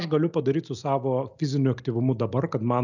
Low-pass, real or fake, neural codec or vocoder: 7.2 kHz; real; none